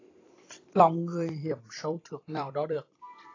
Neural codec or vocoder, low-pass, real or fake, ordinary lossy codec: vocoder, 44.1 kHz, 128 mel bands every 256 samples, BigVGAN v2; 7.2 kHz; fake; AAC, 32 kbps